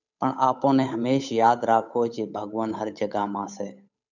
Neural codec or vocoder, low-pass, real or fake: codec, 16 kHz, 8 kbps, FunCodec, trained on Chinese and English, 25 frames a second; 7.2 kHz; fake